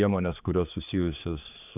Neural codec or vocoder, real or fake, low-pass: codec, 16 kHz, 4 kbps, X-Codec, HuBERT features, trained on general audio; fake; 3.6 kHz